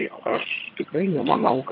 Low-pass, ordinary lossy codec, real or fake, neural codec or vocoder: 5.4 kHz; AAC, 32 kbps; fake; vocoder, 22.05 kHz, 80 mel bands, HiFi-GAN